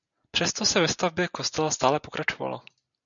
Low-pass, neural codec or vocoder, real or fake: 7.2 kHz; none; real